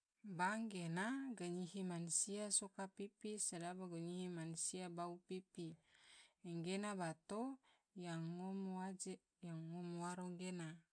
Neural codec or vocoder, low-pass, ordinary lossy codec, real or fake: none; 9.9 kHz; none; real